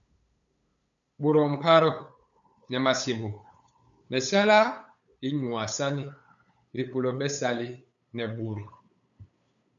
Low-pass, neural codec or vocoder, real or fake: 7.2 kHz; codec, 16 kHz, 8 kbps, FunCodec, trained on LibriTTS, 25 frames a second; fake